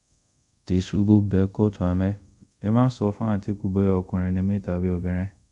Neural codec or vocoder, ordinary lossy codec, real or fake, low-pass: codec, 24 kHz, 0.5 kbps, DualCodec; none; fake; 10.8 kHz